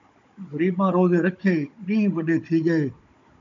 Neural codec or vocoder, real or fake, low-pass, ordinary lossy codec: codec, 16 kHz, 16 kbps, FunCodec, trained on Chinese and English, 50 frames a second; fake; 7.2 kHz; AAC, 64 kbps